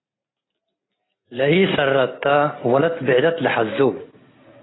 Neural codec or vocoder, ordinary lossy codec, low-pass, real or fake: none; AAC, 16 kbps; 7.2 kHz; real